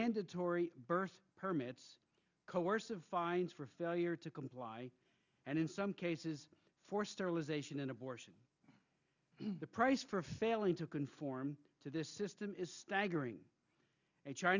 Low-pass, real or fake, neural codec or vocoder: 7.2 kHz; real; none